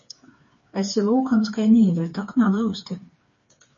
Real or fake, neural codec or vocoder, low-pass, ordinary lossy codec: fake; codec, 16 kHz, 4 kbps, FreqCodec, smaller model; 7.2 kHz; MP3, 32 kbps